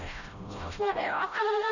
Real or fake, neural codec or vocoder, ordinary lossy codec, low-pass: fake; codec, 16 kHz, 0.5 kbps, FreqCodec, smaller model; none; 7.2 kHz